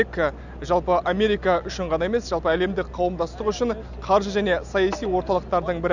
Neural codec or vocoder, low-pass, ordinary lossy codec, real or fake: none; 7.2 kHz; none; real